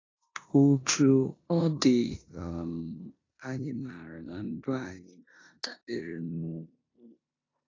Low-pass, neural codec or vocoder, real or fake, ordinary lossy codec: 7.2 kHz; codec, 16 kHz in and 24 kHz out, 0.9 kbps, LongCat-Audio-Codec, four codebook decoder; fake; AAC, 32 kbps